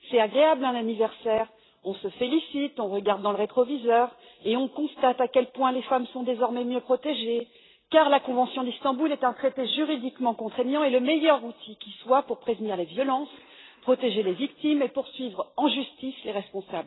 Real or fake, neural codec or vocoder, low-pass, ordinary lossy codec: real; none; 7.2 kHz; AAC, 16 kbps